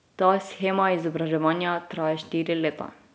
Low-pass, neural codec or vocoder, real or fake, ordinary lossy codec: none; none; real; none